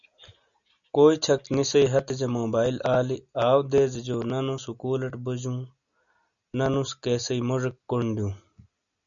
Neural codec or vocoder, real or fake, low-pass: none; real; 7.2 kHz